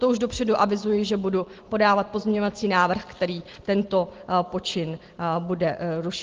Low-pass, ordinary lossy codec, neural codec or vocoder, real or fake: 7.2 kHz; Opus, 16 kbps; none; real